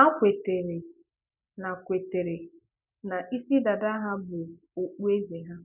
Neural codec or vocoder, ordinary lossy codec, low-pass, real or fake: none; none; 3.6 kHz; real